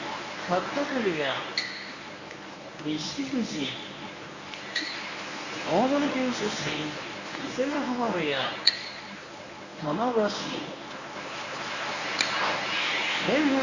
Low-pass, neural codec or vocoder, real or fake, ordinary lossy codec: 7.2 kHz; codec, 24 kHz, 0.9 kbps, WavTokenizer, medium speech release version 1; fake; none